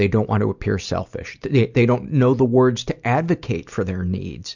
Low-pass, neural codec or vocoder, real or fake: 7.2 kHz; none; real